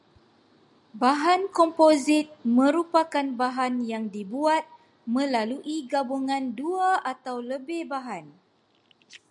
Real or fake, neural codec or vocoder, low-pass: real; none; 10.8 kHz